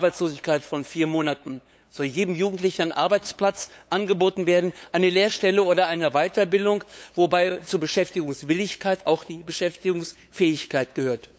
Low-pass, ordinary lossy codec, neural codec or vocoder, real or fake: none; none; codec, 16 kHz, 8 kbps, FunCodec, trained on LibriTTS, 25 frames a second; fake